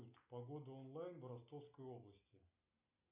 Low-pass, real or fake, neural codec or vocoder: 3.6 kHz; real; none